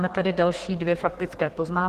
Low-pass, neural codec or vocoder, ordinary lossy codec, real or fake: 14.4 kHz; codec, 32 kHz, 1.9 kbps, SNAC; Opus, 16 kbps; fake